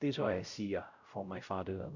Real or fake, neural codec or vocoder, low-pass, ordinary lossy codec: fake; codec, 16 kHz, 0.5 kbps, X-Codec, WavLM features, trained on Multilingual LibriSpeech; 7.2 kHz; none